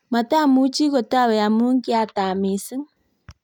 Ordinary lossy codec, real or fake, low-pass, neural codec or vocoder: none; real; 19.8 kHz; none